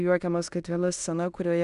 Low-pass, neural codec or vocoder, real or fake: 10.8 kHz; codec, 16 kHz in and 24 kHz out, 0.9 kbps, LongCat-Audio-Codec, fine tuned four codebook decoder; fake